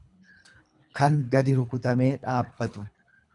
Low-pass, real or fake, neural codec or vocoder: 10.8 kHz; fake; codec, 24 kHz, 3 kbps, HILCodec